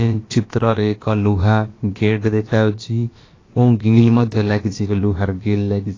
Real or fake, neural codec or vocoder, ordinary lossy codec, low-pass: fake; codec, 16 kHz, about 1 kbps, DyCAST, with the encoder's durations; AAC, 32 kbps; 7.2 kHz